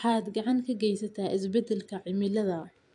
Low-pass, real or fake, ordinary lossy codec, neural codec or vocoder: 10.8 kHz; fake; AAC, 64 kbps; vocoder, 44.1 kHz, 128 mel bands every 256 samples, BigVGAN v2